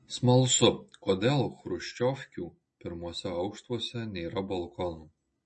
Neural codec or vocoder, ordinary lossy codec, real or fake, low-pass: none; MP3, 32 kbps; real; 9.9 kHz